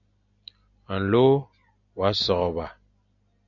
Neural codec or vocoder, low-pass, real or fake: none; 7.2 kHz; real